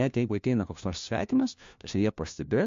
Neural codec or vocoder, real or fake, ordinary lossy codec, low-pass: codec, 16 kHz, 1 kbps, FunCodec, trained on LibriTTS, 50 frames a second; fake; MP3, 48 kbps; 7.2 kHz